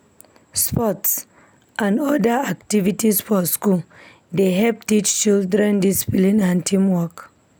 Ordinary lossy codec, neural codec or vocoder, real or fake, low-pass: none; none; real; none